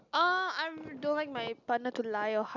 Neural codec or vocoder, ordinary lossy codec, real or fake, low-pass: none; none; real; 7.2 kHz